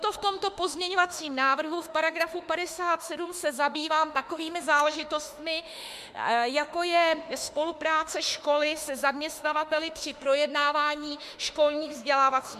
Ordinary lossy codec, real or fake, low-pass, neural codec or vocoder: MP3, 96 kbps; fake; 14.4 kHz; autoencoder, 48 kHz, 32 numbers a frame, DAC-VAE, trained on Japanese speech